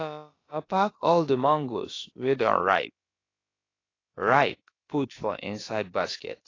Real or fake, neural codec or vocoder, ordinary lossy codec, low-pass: fake; codec, 16 kHz, about 1 kbps, DyCAST, with the encoder's durations; AAC, 32 kbps; 7.2 kHz